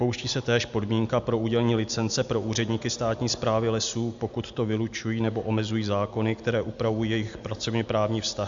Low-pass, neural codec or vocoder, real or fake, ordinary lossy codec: 7.2 kHz; none; real; MP3, 64 kbps